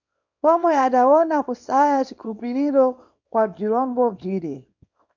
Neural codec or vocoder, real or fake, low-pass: codec, 24 kHz, 0.9 kbps, WavTokenizer, small release; fake; 7.2 kHz